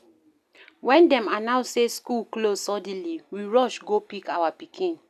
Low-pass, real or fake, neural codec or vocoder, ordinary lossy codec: 14.4 kHz; real; none; none